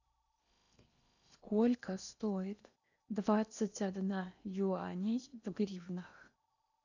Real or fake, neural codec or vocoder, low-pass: fake; codec, 16 kHz in and 24 kHz out, 0.8 kbps, FocalCodec, streaming, 65536 codes; 7.2 kHz